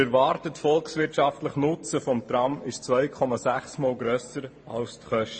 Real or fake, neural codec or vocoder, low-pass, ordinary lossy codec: fake; vocoder, 44.1 kHz, 128 mel bands every 512 samples, BigVGAN v2; 9.9 kHz; MP3, 32 kbps